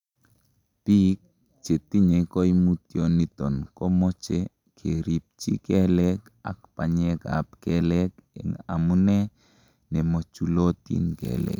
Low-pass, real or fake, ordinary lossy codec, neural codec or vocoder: 19.8 kHz; real; none; none